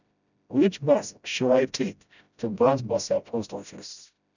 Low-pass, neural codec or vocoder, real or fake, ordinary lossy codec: 7.2 kHz; codec, 16 kHz, 0.5 kbps, FreqCodec, smaller model; fake; none